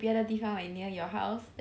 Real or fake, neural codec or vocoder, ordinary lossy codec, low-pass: real; none; none; none